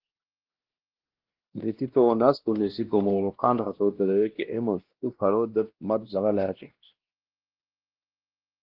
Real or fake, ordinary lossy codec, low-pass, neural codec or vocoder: fake; Opus, 16 kbps; 5.4 kHz; codec, 16 kHz, 1 kbps, X-Codec, WavLM features, trained on Multilingual LibriSpeech